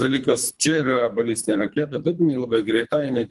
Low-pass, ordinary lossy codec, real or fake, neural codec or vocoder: 10.8 kHz; Opus, 32 kbps; fake; codec, 24 kHz, 3 kbps, HILCodec